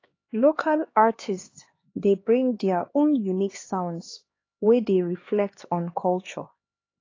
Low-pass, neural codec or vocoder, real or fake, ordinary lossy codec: 7.2 kHz; codec, 16 kHz, 2 kbps, X-Codec, HuBERT features, trained on LibriSpeech; fake; AAC, 32 kbps